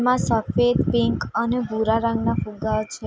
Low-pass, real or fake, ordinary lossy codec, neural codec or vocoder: none; real; none; none